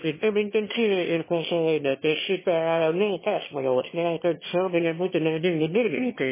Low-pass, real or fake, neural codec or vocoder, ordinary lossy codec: 3.6 kHz; fake; autoencoder, 22.05 kHz, a latent of 192 numbers a frame, VITS, trained on one speaker; MP3, 16 kbps